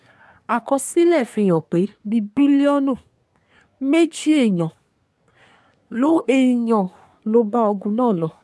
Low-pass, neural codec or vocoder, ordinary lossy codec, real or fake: none; codec, 24 kHz, 1 kbps, SNAC; none; fake